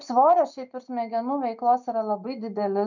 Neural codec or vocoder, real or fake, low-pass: none; real; 7.2 kHz